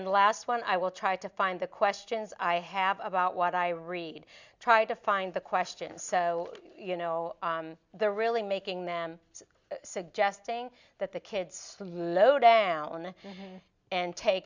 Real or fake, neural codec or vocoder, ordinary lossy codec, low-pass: real; none; Opus, 64 kbps; 7.2 kHz